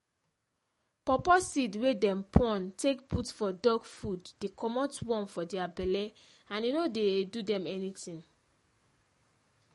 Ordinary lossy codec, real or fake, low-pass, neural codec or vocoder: MP3, 48 kbps; fake; 19.8 kHz; codec, 44.1 kHz, 7.8 kbps, DAC